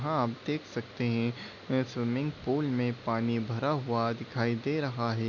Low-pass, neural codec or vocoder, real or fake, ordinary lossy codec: 7.2 kHz; none; real; none